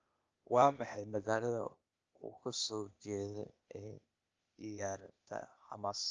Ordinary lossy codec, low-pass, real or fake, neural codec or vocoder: Opus, 24 kbps; 7.2 kHz; fake; codec, 16 kHz, 0.8 kbps, ZipCodec